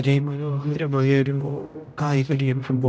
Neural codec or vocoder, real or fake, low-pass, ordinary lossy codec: codec, 16 kHz, 0.5 kbps, X-Codec, HuBERT features, trained on general audio; fake; none; none